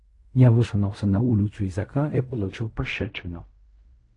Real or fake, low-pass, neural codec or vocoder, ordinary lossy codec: fake; 10.8 kHz; codec, 16 kHz in and 24 kHz out, 0.4 kbps, LongCat-Audio-Codec, fine tuned four codebook decoder; AAC, 48 kbps